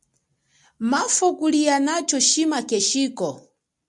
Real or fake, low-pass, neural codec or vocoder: real; 10.8 kHz; none